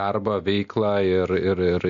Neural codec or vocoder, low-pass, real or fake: none; 7.2 kHz; real